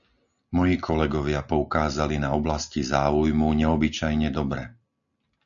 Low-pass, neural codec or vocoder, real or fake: 7.2 kHz; none; real